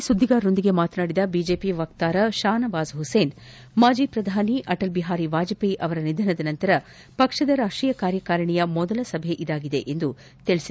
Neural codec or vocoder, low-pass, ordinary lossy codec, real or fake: none; none; none; real